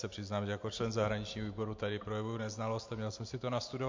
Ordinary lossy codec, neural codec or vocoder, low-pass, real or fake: MP3, 48 kbps; none; 7.2 kHz; real